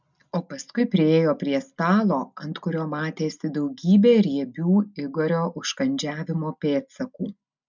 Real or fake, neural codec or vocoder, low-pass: real; none; 7.2 kHz